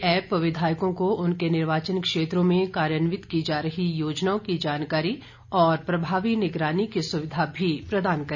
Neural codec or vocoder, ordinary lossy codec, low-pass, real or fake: none; none; 7.2 kHz; real